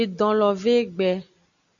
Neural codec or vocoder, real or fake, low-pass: none; real; 7.2 kHz